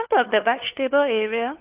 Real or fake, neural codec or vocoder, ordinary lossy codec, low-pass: fake; codec, 16 kHz, 4 kbps, FunCodec, trained on LibriTTS, 50 frames a second; Opus, 32 kbps; 3.6 kHz